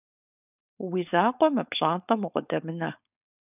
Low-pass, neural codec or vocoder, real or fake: 3.6 kHz; codec, 16 kHz, 4.8 kbps, FACodec; fake